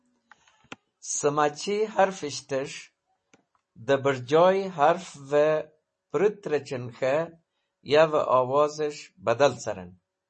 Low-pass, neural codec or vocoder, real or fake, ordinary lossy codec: 10.8 kHz; none; real; MP3, 32 kbps